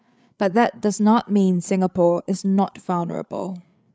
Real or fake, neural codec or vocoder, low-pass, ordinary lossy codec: fake; codec, 16 kHz, 8 kbps, FreqCodec, larger model; none; none